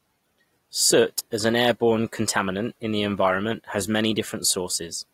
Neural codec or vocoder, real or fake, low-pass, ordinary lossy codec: none; real; 19.8 kHz; AAC, 48 kbps